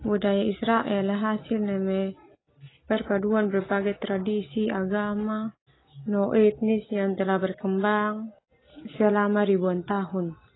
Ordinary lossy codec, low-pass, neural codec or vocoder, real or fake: AAC, 16 kbps; 7.2 kHz; none; real